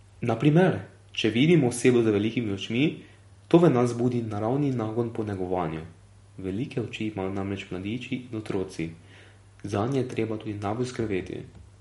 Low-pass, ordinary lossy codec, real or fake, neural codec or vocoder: 19.8 kHz; MP3, 48 kbps; real; none